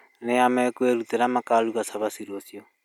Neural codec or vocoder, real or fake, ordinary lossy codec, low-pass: none; real; none; 19.8 kHz